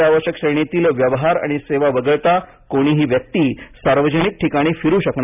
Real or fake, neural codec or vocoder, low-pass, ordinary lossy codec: real; none; 3.6 kHz; none